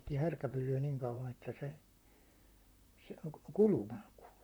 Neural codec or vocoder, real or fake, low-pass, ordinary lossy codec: vocoder, 44.1 kHz, 128 mel bands, Pupu-Vocoder; fake; none; none